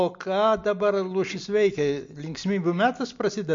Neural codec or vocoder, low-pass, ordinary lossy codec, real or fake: none; 7.2 kHz; MP3, 48 kbps; real